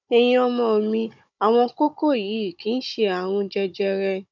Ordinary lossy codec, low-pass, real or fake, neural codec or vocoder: none; 7.2 kHz; fake; codec, 16 kHz, 16 kbps, FunCodec, trained on Chinese and English, 50 frames a second